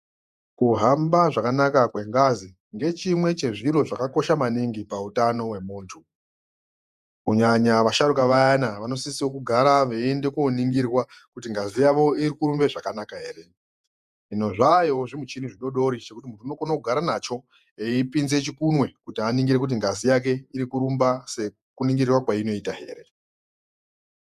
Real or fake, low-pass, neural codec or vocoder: fake; 14.4 kHz; vocoder, 48 kHz, 128 mel bands, Vocos